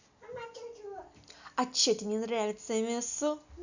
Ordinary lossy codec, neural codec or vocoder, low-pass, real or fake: none; none; 7.2 kHz; real